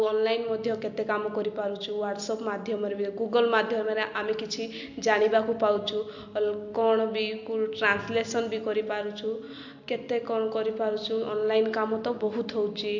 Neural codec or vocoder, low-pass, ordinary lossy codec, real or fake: none; 7.2 kHz; MP3, 48 kbps; real